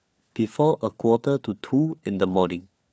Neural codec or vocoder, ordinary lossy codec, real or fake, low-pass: codec, 16 kHz, 4 kbps, FunCodec, trained on LibriTTS, 50 frames a second; none; fake; none